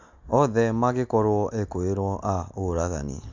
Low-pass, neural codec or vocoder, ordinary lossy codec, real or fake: 7.2 kHz; none; MP3, 64 kbps; real